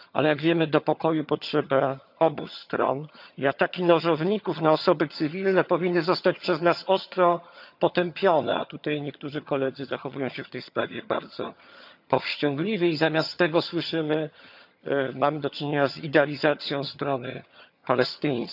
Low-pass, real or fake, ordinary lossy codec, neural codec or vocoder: 5.4 kHz; fake; none; vocoder, 22.05 kHz, 80 mel bands, HiFi-GAN